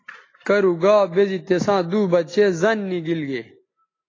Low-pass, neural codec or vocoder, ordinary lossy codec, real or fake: 7.2 kHz; none; AAC, 32 kbps; real